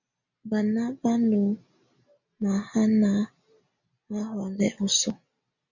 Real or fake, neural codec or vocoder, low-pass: real; none; 7.2 kHz